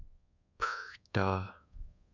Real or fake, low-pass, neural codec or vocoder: fake; 7.2 kHz; codec, 24 kHz, 1.2 kbps, DualCodec